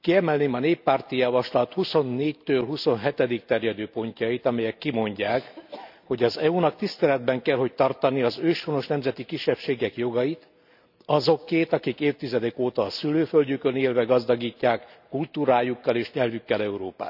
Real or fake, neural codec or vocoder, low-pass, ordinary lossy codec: real; none; 5.4 kHz; none